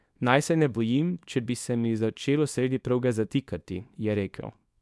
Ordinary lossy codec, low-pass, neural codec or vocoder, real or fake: none; none; codec, 24 kHz, 0.9 kbps, WavTokenizer, small release; fake